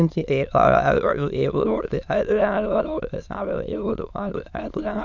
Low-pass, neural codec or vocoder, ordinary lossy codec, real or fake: 7.2 kHz; autoencoder, 22.05 kHz, a latent of 192 numbers a frame, VITS, trained on many speakers; none; fake